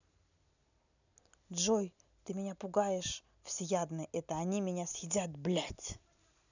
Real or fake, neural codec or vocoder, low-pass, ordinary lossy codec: real; none; 7.2 kHz; none